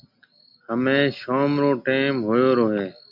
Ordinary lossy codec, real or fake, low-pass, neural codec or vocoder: MP3, 48 kbps; real; 5.4 kHz; none